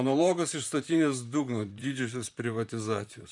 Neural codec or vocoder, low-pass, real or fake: vocoder, 44.1 kHz, 128 mel bands, Pupu-Vocoder; 10.8 kHz; fake